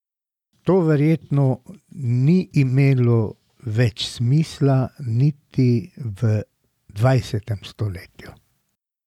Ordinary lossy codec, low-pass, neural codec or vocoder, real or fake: none; 19.8 kHz; none; real